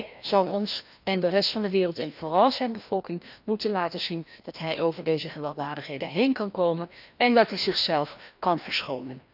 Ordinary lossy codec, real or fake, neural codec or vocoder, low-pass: none; fake; codec, 16 kHz, 1 kbps, FreqCodec, larger model; 5.4 kHz